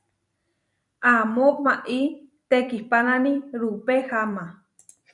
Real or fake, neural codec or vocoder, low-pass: fake; vocoder, 44.1 kHz, 128 mel bands every 256 samples, BigVGAN v2; 10.8 kHz